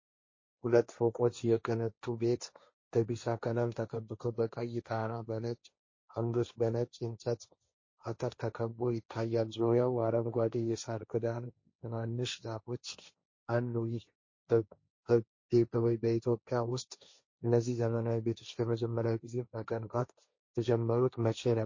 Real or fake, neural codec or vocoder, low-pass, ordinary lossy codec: fake; codec, 16 kHz, 1.1 kbps, Voila-Tokenizer; 7.2 kHz; MP3, 32 kbps